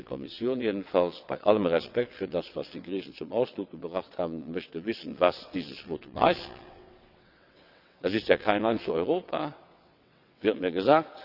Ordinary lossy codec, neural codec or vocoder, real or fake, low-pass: none; vocoder, 22.05 kHz, 80 mel bands, WaveNeXt; fake; 5.4 kHz